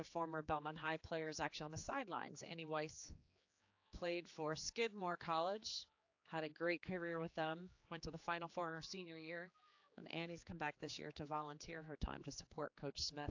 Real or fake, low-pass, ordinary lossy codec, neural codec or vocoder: fake; 7.2 kHz; AAC, 48 kbps; codec, 16 kHz, 4 kbps, X-Codec, HuBERT features, trained on general audio